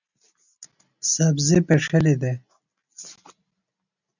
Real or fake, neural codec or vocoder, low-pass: real; none; 7.2 kHz